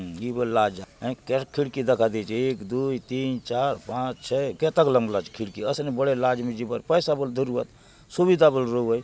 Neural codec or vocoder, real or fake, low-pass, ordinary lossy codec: none; real; none; none